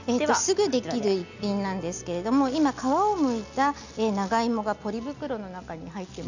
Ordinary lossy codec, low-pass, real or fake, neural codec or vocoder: none; 7.2 kHz; real; none